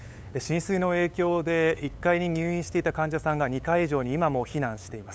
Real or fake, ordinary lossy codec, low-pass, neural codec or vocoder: fake; none; none; codec, 16 kHz, 8 kbps, FunCodec, trained on LibriTTS, 25 frames a second